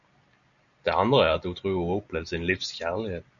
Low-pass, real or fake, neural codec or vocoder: 7.2 kHz; real; none